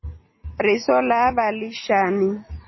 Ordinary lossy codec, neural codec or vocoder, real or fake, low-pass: MP3, 24 kbps; none; real; 7.2 kHz